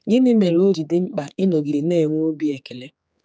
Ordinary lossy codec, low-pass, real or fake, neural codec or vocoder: none; none; fake; codec, 16 kHz, 4 kbps, X-Codec, HuBERT features, trained on general audio